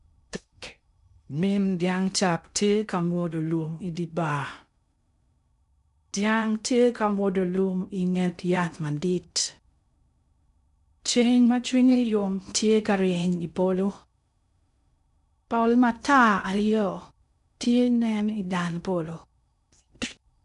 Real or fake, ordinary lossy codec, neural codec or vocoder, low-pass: fake; none; codec, 16 kHz in and 24 kHz out, 0.6 kbps, FocalCodec, streaming, 2048 codes; 10.8 kHz